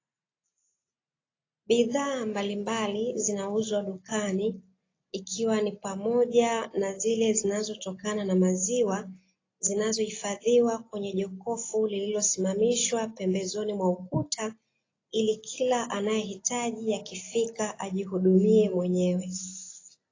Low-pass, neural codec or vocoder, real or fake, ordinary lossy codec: 7.2 kHz; none; real; AAC, 32 kbps